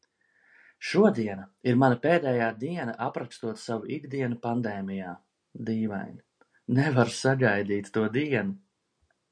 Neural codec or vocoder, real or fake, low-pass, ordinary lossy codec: none; real; 9.9 kHz; MP3, 96 kbps